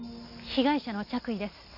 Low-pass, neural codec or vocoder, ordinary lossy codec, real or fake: 5.4 kHz; none; none; real